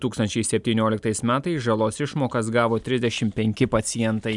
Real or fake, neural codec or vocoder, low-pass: real; none; 10.8 kHz